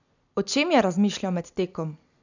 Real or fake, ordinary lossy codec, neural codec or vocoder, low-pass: real; none; none; 7.2 kHz